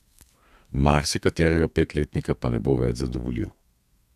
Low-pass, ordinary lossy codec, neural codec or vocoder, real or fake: 14.4 kHz; none; codec, 32 kHz, 1.9 kbps, SNAC; fake